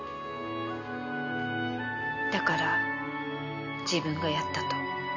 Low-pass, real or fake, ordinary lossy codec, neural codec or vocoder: 7.2 kHz; real; none; none